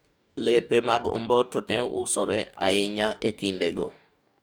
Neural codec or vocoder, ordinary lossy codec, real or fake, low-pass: codec, 44.1 kHz, 2.6 kbps, DAC; none; fake; none